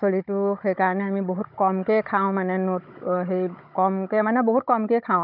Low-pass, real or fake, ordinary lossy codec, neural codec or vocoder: 5.4 kHz; fake; none; codec, 16 kHz, 16 kbps, FunCodec, trained on Chinese and English, 50 frames a second